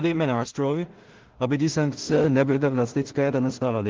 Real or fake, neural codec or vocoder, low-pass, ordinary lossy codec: fake; codec, 16 kHz in and 24 kHz out, 0.4 kbps, LongCat-Audio-Codec, two codebook decoder; 7.2 kHz; Opus, 16 kbps